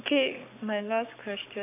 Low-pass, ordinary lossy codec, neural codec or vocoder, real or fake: 3.6 kHz; AAC, 32 kbps; codec, 44.1 kHz, 7.8 kbps, Pupu-Codec; fake